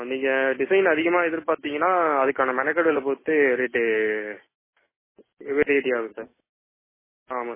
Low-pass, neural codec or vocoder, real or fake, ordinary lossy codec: 3.6 kHz; none; real; MP3, 16 kbps